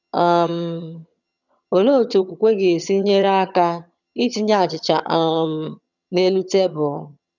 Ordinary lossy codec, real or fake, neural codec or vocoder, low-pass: none; fake; vocoder, 22.05 kHz, 80 mel bands, HiFi-GAN; 7.2 kHz